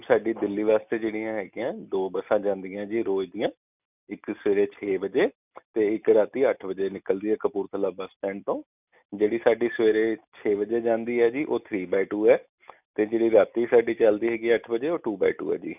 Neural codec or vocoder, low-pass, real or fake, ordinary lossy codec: none; 3.6 kHz; real; none